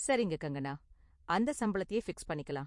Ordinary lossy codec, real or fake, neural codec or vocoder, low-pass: MP3, 48 kbps; fake; vocoder, 44.1 kHz, 128 mel bands every 512 samples, BigVGAN v2; 10.8 kHz